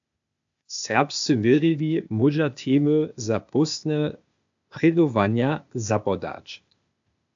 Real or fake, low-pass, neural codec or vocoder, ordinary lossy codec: fake; 7.2 kHz; codec, 16 kHz, 0.8 kbps, ZipCodec; AAC, 64 kbps